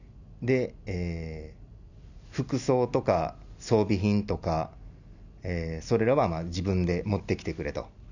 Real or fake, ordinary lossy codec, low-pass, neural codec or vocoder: real; none; 7.2 kHz; none